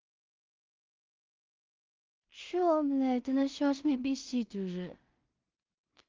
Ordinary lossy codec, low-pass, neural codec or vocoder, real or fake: Opus, 24 kbps; 7.2 kHz; codec, 16 kHz in and 24 kHz out, 0.4 kbps, LongCat-Audio-Codec, two codebook decoder; fake